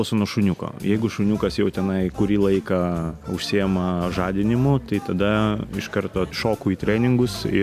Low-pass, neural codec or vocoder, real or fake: 14.4 kHz; none; real